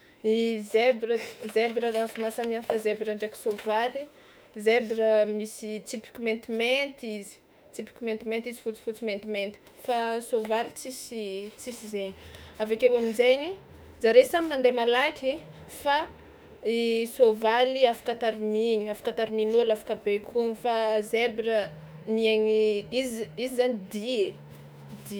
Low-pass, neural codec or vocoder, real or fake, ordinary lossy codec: none; autoencoder, 48 kHz, 32 numbers a frame, DAC-VAE, trained on Japanese speech; fake; none